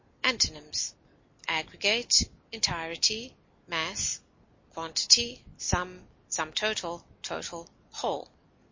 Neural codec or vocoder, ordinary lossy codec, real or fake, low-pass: none; MP3, 32 kbps; real; 7.2 kHz